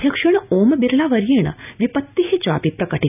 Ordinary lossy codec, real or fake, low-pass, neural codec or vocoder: none; real; 3.6 kHz; none